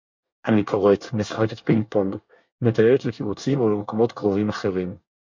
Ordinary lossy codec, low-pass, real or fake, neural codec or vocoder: MP3, 48 kbps; 7.2 kHz; fake; codec, 24 kHz, 1 kbps, SNAC